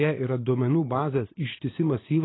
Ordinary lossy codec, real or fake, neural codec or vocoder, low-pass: AAC, 16 kbps; fake; vocoder, 44.1 kHz, 128 mel bands every 512 samples, BigVGAN v2; 7.2 kHz